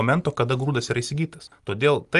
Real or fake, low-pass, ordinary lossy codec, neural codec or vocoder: real; 10.8 kHz; Opus, 32 kbps; none